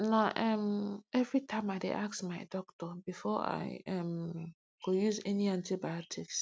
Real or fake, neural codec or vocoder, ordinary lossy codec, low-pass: real; none; none; none